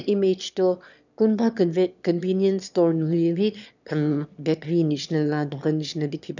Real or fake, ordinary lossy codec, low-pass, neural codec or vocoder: fake; none; 7.2 kHz; autoencoder, 22.05 kHz, a latent of 192 numbers a frame, VITS, trained on one speaker